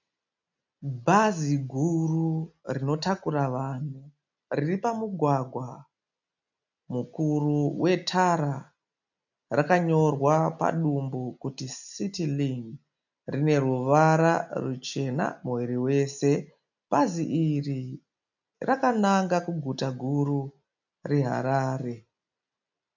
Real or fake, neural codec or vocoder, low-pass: real; none; 7.2 kHz